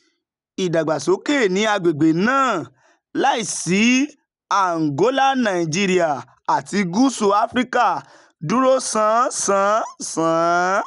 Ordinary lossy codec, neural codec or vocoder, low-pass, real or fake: none; none; 10.8 kHz; real